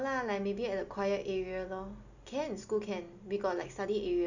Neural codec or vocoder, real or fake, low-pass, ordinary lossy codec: none; real; 7.2 kHz; none